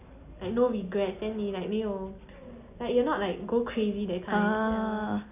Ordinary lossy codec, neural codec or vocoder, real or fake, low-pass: none; none; real; 3.6 kHz